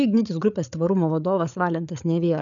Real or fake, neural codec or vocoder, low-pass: fake; codec, 16 kHz, 8 kbps, FreqCodec, larger model; 7.2 kHz